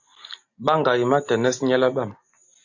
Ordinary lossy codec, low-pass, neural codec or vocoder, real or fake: AAC, 48 kbps; 7.2 kHz; none; real